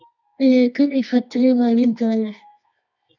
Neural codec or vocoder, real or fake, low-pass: codec, 24 kHz, 0.9 kbps, WavTokenizer, medium music audio release; fake; 7.2 kHz